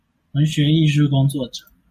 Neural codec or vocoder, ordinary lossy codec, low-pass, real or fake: none; MP3, 64 kbps; 14.4 kHz; real